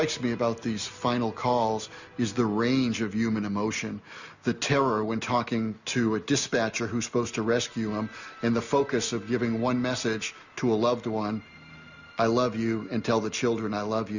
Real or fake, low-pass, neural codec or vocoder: real; 7.2 kHz; none